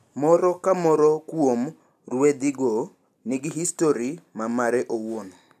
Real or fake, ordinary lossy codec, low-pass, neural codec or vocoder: real; AAC, 96 kbps; 14.4 kHz; none